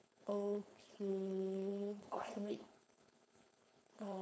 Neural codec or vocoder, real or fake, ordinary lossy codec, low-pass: codec, 16 kHz, 4.8 kbps, FACodec; fake; none; none